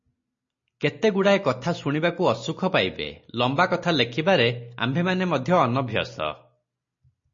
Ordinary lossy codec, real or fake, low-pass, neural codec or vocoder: MP3, 32 kbps; real; 7.2 kHz; none